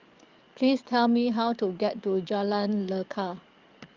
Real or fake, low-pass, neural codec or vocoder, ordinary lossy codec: fake; 7.2 kHz; codec, 44.1 kHz, 7.8 kbps, DAC; Opus, 32 kbps